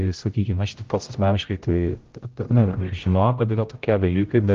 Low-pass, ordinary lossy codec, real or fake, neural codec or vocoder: 7.2 kHz; Opus, 32 kbps; fake; codec, 16 kHz, 0.5 kbps, X-Codec, HuBERT features, trained on general audio